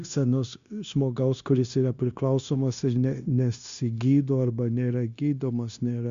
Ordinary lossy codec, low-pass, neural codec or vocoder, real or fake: Opus, 64 kbps; 7.2 kHz; codec, 16 kHz, 0.9 kbps, LongCat-Audio-Codec; fake